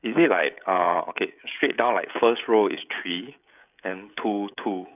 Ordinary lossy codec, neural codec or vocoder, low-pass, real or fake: none; codec, 16 kHz, 16 kbps, FreqCodec, smaller model; 3.6 kHz; fake